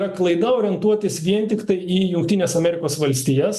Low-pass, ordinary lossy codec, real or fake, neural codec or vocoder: 14.4 kHz; MP3, 96 kbps; real; none